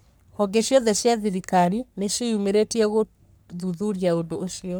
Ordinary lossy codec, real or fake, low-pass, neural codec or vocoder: none; fake; none; codec, 44.1 kHz, 3.4 kbps, Pupu-Codec